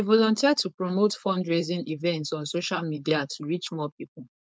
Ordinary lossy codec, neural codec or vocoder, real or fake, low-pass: none; codec, 16 kHz, 4.8 kbps, FACodec; fake; none